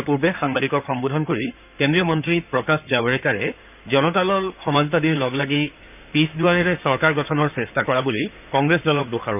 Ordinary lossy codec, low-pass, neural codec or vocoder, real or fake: none; 3.6 kHz; codec, 16 kHz in and 24 kHz out, 2.2 kbps, FireRedTTS-2 codec; fake